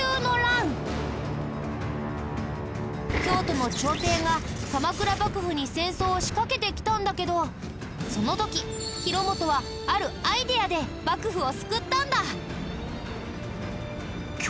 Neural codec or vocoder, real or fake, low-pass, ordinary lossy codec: none; real; none; none